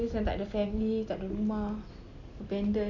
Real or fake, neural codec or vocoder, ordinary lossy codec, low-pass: real; none; Opus, 64 kbps; 7.2 kHz